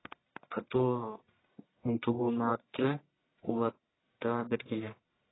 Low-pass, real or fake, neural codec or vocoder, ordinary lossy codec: 7.2 kHz; fake; codec, 44.1 kHz, 1.7 kbps, Pupu-Codec; AAC, 16 kbps